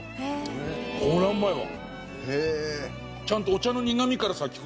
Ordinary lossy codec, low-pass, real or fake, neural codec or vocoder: none; none; real; none